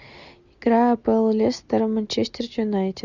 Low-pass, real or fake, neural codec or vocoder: 7.2 kHz; real; none